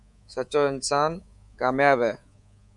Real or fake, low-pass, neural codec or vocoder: fake; 10.8 kHz; codec, 24 kHz, 3.1 kbps, DualCodec